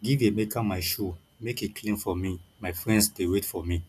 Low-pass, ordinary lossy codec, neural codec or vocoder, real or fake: 14.4 kHz; none; none; real